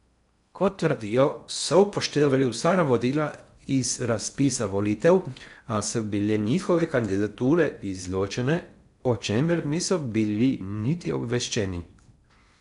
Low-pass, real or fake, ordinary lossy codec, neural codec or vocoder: 10.8 kHz; fake; none; codec, 16 kHz in and 24 kHz out, 0.6 kbps, FocalCodec, streaming, 4096 codes